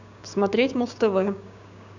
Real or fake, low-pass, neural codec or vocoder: fake; 7.2 kHz; codec, 16 kHz, 6 kbps, DAC